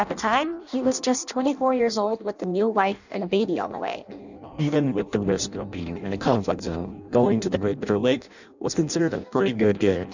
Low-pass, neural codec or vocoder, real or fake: 7.2 kHz; codec, 16 kHz in and 24 kHz out, 0.6 kbps, FireRedTTS-2 codec; fake